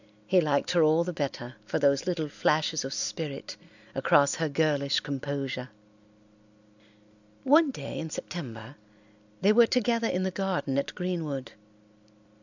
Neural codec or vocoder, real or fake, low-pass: none; real; 7.2 kHz